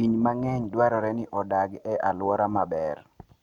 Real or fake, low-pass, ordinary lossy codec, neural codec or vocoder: real; 19.8 kHz; MP3, 96 kbps; none